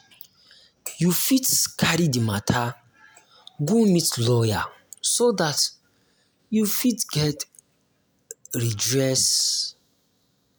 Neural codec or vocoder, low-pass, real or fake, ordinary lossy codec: none; none; real; none